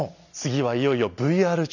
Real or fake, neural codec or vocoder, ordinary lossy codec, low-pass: real; none; none; 7.2 kHz